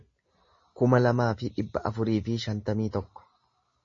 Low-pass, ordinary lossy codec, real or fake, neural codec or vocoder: 7.2 kHz; MP3, 32 kbps; real; none